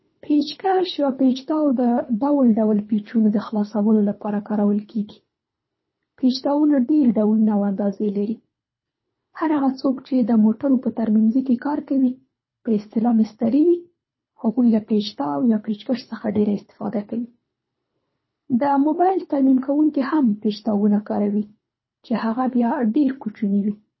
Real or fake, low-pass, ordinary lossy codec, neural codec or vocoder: fake; 7.2 kHz; MP3, 24 kbps; codec, 24 kHz, 3 kbps, HILCodec